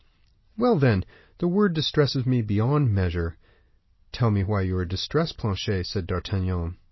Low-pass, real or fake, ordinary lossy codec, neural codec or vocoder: 7.2 kHz; real; MP3, 24 kbps; none